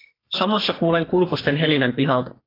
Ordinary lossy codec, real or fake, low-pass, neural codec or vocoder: AAC, 24 kbps; fake; 5.4 kHz; codec, 44.1 kHz, 2.6 kbps, SNAC